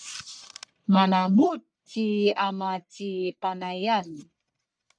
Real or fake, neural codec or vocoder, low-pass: fake; codec, 44.1 kHz, 1.7 kbps, Pupu-Codec; 9.9 kHz